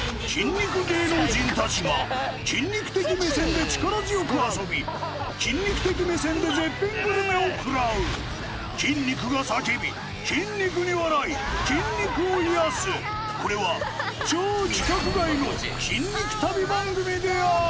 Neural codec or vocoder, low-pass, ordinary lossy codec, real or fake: none; none; none; real